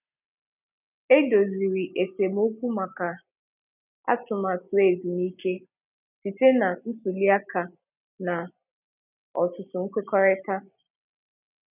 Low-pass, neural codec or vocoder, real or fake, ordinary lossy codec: 3.6 kHz; none; real; none